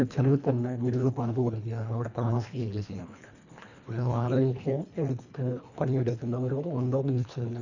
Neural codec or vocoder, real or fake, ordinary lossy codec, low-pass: codec, 24 kHz, 1.5 kbps, HILCodec; fake; none; 7.2 kHz